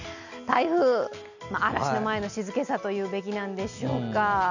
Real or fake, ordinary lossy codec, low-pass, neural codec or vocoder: real; none; 7.2 kHz; none